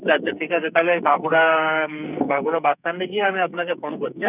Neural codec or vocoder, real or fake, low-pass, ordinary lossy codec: codec, 44.1 kHz, 2.6 kbps, SNAC; fake; 3.6 kHz; none